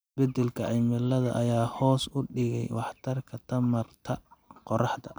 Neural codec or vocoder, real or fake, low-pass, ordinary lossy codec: none; real; none; none